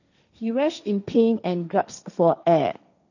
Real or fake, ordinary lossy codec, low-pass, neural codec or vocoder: fake; none; 7.2 kHz; codec, 16 kHz, 1.1 kbps, Voila-Tokenizer